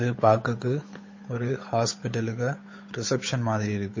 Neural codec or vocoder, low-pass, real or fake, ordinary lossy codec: codec, 16 kHz, 8 kbps, FunCodec, trained on Chinese and English, 25 frames a second; 7.2 kHz; fake; MP3, 32 kbps